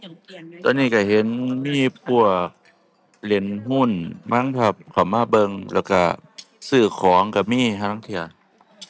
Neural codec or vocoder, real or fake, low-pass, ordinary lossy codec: none; real; none; none